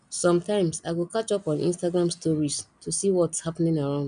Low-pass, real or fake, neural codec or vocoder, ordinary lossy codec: 9.9 kHz; real; none; none